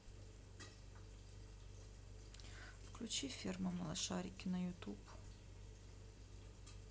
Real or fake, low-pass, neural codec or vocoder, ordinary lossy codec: real; none; none; none